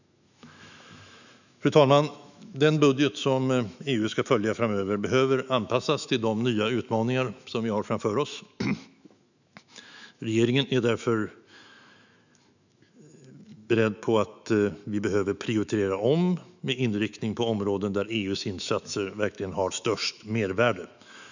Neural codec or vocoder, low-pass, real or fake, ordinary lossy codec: autoencoder, 48 kHz, 128 numbers a frame, DAC-VAE, trained on Japanese speech; 7.2 kHz; fake; none